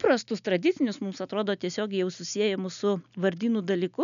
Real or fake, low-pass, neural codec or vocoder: real; 7.2 kHz; none